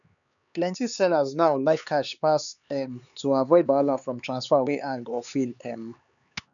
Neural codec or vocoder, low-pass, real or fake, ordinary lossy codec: codec, 16 kHz, 4 kbps, X-Codec, HuBERT features, trained on LibriSpeech; 7.2 kHz; fake; none